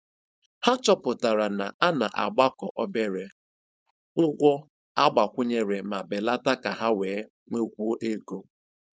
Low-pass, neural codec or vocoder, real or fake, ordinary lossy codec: none; codec, 16 kHz, 4.8 kbps, FACodec; fake; none